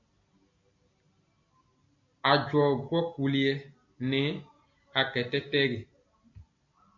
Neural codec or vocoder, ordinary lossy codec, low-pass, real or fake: none; MP3, 48 kbps; 7.2 kHz; real